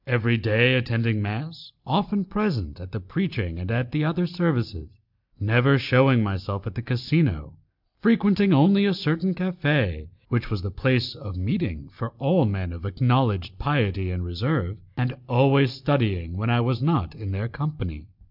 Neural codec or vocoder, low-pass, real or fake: none; 5.4 kHz; real